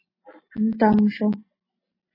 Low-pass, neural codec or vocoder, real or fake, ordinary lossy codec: 5.4 kHz; none; real; MP3, 24 kbps